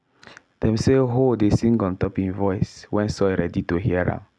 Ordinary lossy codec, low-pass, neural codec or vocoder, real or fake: none; none; none; real